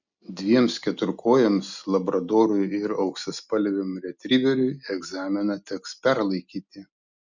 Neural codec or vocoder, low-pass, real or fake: none; 7.2 kHz; real